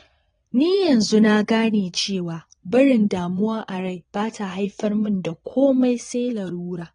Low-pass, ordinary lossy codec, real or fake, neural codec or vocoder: 9.9 kHz; AAC, 32 kbps; fake; vocoder, 22.05 kHz, 80 mel bands, Vocos